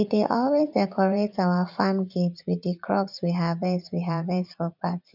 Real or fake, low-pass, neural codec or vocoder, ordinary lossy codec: fake; 5.4 kHz; vocoder, 44.1 kHz, 80 mel bands, Vocos; none